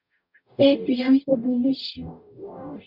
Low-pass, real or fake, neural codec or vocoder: 5.4 kHz; fake; codec, 44.1 kHz, 0.9 kbps, DAC